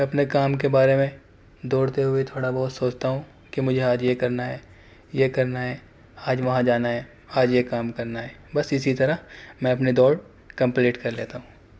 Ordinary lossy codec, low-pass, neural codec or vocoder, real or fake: none; none; none; real